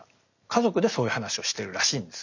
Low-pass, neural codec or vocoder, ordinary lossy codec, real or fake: 7.2 kHz; none; none; real